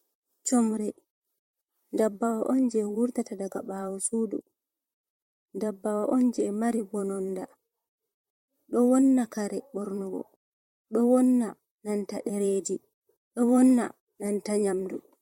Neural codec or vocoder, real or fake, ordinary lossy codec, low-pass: vocoder, 44.1 kHz, 128 mel bands, Pupu-Vocoder; fake; MP3, 64 kbps; 19.8 kHz